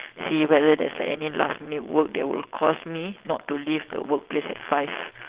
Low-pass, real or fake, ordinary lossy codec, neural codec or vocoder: 3.6 kHz; fake; Opus, 16 kbps; vocoder, 22.05 kHz, 80 mel bands, WaveNeXt